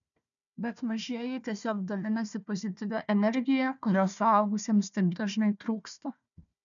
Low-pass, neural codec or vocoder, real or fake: 7.2 kHz; codec, 16 kHz, 1 kbps, FunCodec, trained on Chinese and English, 50 frames a second; fake